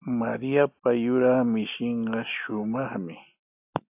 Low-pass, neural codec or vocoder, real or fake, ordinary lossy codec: 3.6 kHz; none; real; AAC, 32 kbps